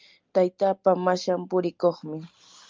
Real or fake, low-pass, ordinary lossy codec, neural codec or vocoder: real; 7.2 kHz; Opus, 24 kbps; none